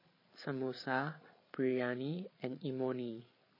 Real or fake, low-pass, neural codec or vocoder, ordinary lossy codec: fake; 5.4 kHz; codec, 16 kHz, 8 kbps, FreqCodec, larger model; MP3, 24 kbps